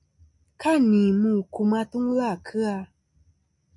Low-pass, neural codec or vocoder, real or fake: 10.8 kHz; none; real